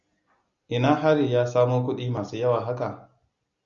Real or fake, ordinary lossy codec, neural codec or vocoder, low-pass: real; Opus, 64 kbps; none; 7.2 kHz